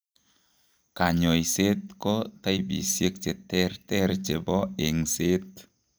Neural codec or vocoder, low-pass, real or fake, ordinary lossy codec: none; none; real; none